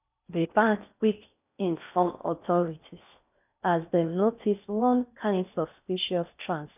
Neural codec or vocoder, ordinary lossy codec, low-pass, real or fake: codec, 16 kHz in and 24 kHz out, 0.6 kbps, FocalCodec, streaming, 4096 codes; none; 3.6 kHz; fake